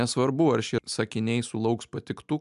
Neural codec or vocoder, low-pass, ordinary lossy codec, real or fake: none; 10.8 kHz; MP3, 96 kbps; real